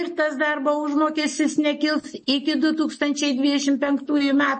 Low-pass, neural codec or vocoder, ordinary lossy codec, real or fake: 9.9 kHz; none; MP3, 32 kbps; real